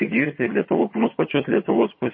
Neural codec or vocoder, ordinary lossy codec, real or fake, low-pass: vocoder, 22.05 kHz, 80 mel bands, HiFi-GAN; MP3, 24 kbps; fake; 7.2 kHz